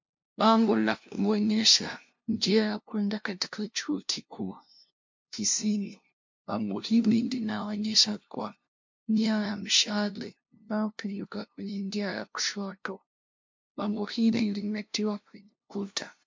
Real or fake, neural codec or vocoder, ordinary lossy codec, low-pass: fake; codec, 16 kHz, 0.5 kbps, FunCodec, trained on LibriTTS, 25 frames a second; MP3, 48 kbps; 7.2 kHz